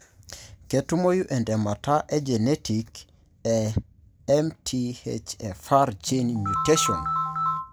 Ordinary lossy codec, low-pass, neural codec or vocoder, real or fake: none; none; none; real